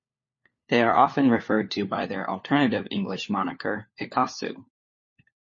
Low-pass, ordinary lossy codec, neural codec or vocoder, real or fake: 7.2 kHz; MP3, 32 kbps; codec, 16 kHz, 4 kbps, FunCodec, trained on LibriTTS, 50 frames a second; fake